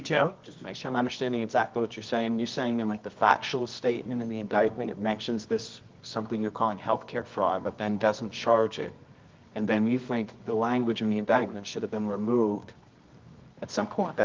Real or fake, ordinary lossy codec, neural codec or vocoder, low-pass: fake; Opus, 24 kbps; codec, 24 kHz, 0.9 kbps, WavTokenizer, medium music audio release; 7.2 kHz